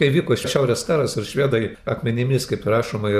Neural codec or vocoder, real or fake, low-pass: vocoder, 44.1 kHz, 128 mel bands every 512 samples, BigVGAN v2; fake; 14.4 kHz